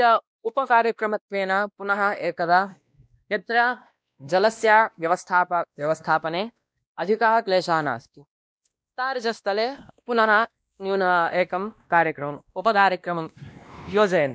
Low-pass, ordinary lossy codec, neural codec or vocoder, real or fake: none; none; codec, 16 kHz, 1 kbps, X-Codec, WavLM features, trained on Multilingual LibriSpeech; fake